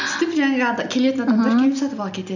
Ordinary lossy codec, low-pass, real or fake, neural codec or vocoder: none; 7.2 kHz; real; none